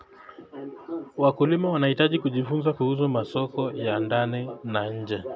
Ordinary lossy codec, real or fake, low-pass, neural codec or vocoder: none; real; none; none